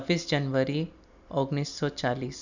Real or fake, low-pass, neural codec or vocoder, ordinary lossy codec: real; 7.2 kHz; none; none